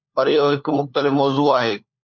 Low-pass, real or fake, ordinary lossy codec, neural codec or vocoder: 7.2 kHz; fake; MP3, 64 kbps; codec, 16 kHz, 4 kbps, FunCodec, trained on LibriTTS, 50 frames a second